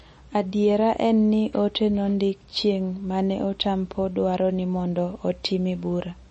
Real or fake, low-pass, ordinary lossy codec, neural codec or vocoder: real; 10.8 kHz; MP3, 32 kbps; none